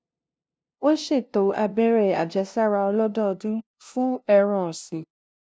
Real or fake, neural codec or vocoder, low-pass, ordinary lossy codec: fake; codec, 16 kHz, 0.5 kbps, FunCodec, trained on LibriTTS, 25 frames a second; none; none